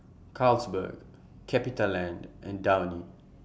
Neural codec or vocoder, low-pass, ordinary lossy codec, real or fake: none; none; none; real